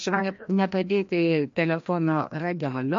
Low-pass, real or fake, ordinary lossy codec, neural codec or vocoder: 7.2 kHz; fake; MP3, 48 kbps; codec, 16 kHz, 1 kbps, FreqCodec, larger model